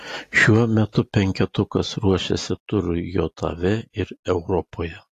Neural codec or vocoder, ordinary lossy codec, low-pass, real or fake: none; AAC, 48 kbps; 14.4 kHz; real